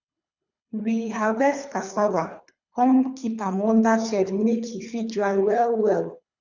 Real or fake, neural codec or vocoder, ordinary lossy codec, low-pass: fake; codec, 24 kHz, 3 kbps, HILCodec; none; 7.2 kHz